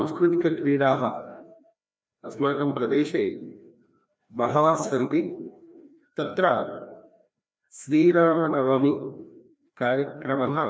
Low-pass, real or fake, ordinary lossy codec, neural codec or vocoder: none; fake; none; codec, 16 kHz, 1 kbps, FreqCodec, larger model